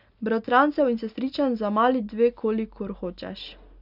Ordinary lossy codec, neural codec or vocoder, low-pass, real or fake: none; none; 5.4 kHz; real